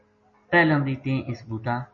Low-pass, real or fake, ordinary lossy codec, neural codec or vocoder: 7.2 kHz; real; MP3, 32 kbps; none